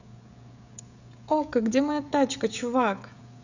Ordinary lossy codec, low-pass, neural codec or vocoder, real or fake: none; 7.2 kHz; codec, 16 kHz, 16 kbps, FreqCodec, smaller model; fake